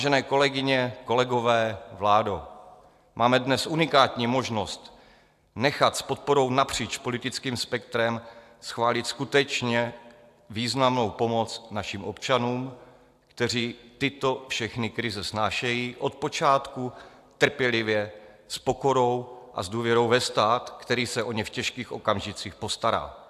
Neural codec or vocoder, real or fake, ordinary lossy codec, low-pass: none; real; AAC, 96 kbps; 14.4 kHz